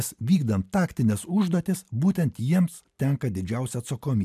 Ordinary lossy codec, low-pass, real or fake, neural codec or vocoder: MP3, 96 kbps; 14.4 kHz; real; none